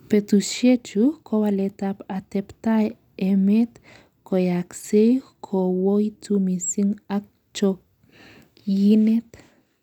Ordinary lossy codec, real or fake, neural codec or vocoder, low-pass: none; real; none; 19.8 kHz